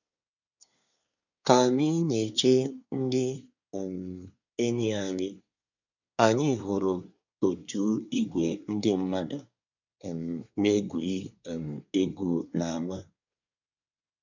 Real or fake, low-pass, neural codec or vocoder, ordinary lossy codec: fake; 7.2 kHz; codec, 24 kHz, 1 kbps, SNAC; none